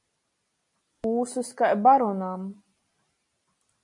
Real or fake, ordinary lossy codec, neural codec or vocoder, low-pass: real; MP3, 48 kbps; none; 10.8 kHz